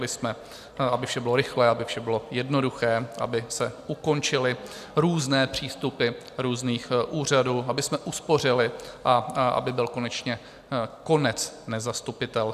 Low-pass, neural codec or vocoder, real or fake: 14.4 kHz; none; real